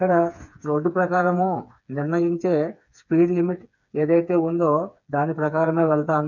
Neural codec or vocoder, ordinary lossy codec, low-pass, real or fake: codec, 16 kHz, 4 kbps, FreqCodec, smaller model; none; 7.2 kHz; fake